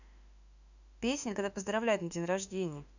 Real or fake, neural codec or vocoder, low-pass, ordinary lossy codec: fake; autoencoder, 48 kHz, 32 numbers a frame, DAC-VAE, trained on Japanese speech; 7.2 kHz; none